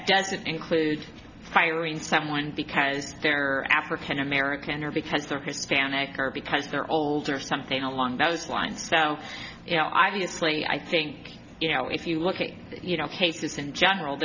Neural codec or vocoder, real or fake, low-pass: none; real; 7.2 kHz